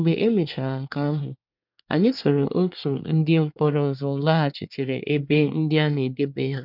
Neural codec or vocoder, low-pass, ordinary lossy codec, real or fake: codec, 24 kHz, 1 kbps, SNAC; 5.4 kHz; none; fake